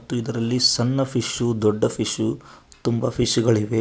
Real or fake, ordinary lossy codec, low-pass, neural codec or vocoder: real; none; none; none